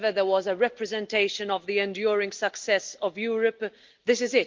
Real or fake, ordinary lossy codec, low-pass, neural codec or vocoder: real; Opus, 32 kbps; 7.2 kHz; none